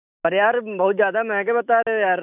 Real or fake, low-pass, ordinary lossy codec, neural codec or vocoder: fake; 3.6 kHz; none; autoencoder, 48 kHz, 128 numbers a frame, DAC-VAE, trained on Japanese speech